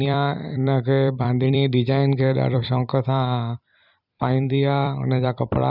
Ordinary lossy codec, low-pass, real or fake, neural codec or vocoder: none; 5.4 kHz; fake; vocoder, 44.1 kHz, 128 mel bands every 256 samples, BigVGAN v2